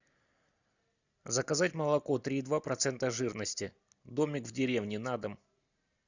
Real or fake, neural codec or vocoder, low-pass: real; none; 7.2 kHz